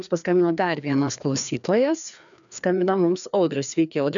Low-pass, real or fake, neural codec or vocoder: 7.2 kHz; fake; codec, 16 kHz, 2 kbps, FreqCodec, larger model